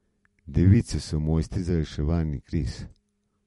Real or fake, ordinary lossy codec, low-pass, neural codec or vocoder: fake; MP3, 48 kbps; 19.8 kHz; vocoder, 44.1 kHz, 128 mel bands every 256 samples, BigVGAN v2